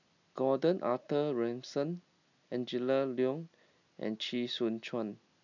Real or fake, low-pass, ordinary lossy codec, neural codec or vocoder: real; 7.2 kHz; none; none